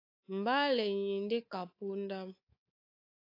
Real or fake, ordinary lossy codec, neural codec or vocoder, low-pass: fake; AAC, 32 kbps; codec, 24 kHz, 3.1 kbps, DualCodec; 5.4 kHz